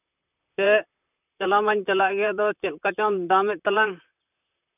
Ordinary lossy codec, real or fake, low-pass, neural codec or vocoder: none; fake; 3.6 kHz; vocoder, 44.1 kHz, 128 mel bands, Pupu-Vocoder